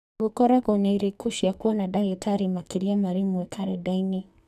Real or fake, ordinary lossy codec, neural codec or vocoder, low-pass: fake; none; codec, 44.1 kHz, 2.6 kbps, SNAC; 14.4 kHz